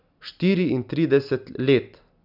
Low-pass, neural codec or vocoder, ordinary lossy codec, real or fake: 5.4 kHz; none; none; real